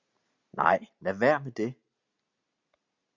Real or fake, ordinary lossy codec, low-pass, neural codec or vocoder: real; Opus, 64 kbps; 7.2 kHz; none